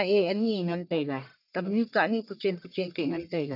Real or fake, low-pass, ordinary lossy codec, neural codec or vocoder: fake; 5.4 kHz; none; codec, 44.1 kHz, 1.7 kbps, Pupu-Codec